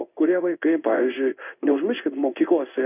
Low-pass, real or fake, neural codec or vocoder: 3.6 kHz; fake; codec, 16 kHz in and 24 kHz out, 1 kbps, XY-Tokenizer